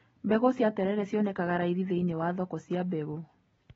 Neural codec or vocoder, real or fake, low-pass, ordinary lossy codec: none; real; 9.9 kHz; AAC, 24 kbps